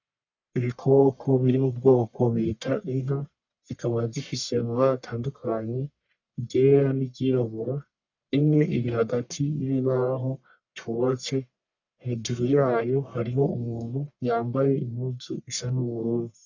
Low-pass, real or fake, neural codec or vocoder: 7.2 kHz; fake; codec, 44.1 kHz, 1.7 kbps, Pupu-Codec